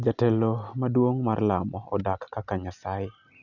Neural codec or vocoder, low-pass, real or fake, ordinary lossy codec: none; 7.2 kHz; real; none